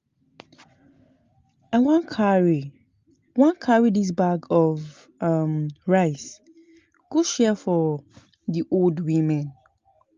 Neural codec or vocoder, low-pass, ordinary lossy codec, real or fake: none; 7.2 kHz; Opus, 32 kbps; real